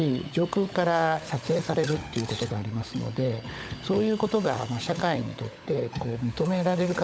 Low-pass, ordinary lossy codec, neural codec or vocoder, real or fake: none; none; codec, 16 kHz, 16 kbps, FunCodec, trained on LibriTTS, 50 frames a second; fake